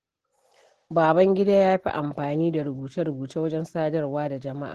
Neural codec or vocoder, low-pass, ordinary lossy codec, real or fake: none; 14.4 kHz; Opus, 16 kbps; real